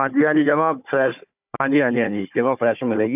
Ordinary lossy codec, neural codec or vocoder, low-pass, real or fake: none; codec, 16 kHz, 4 kbps, FunCodec, trained on Chinese and English, 50 frames a second; 3.6 kHz; fake